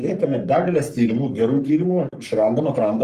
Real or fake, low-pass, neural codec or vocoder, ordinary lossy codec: fake; 14.4 kHz; codec, 44.1 kHz, 3.4 kbps, Pupu-Codec; Opus, 32 kbps